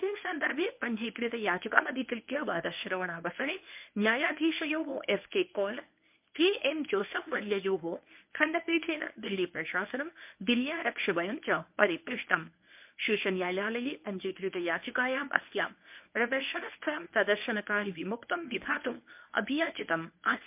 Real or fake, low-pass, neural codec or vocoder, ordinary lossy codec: fake; 3.6 kHz; codec, 24 kHz, 0.9 kbps, WavTokenizer, medium speech release version 1; MP3, 32 kbps